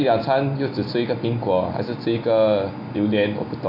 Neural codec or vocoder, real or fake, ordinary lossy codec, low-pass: none; real; MP3, 48 kbps; 5.4 kHz